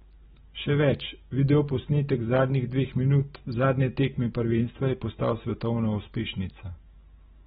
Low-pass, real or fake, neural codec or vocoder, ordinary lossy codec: 10.8 kHz; real; none; AAC, 16 kbps